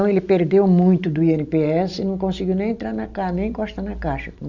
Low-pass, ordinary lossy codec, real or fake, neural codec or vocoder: 7.2 kHz; none; real; none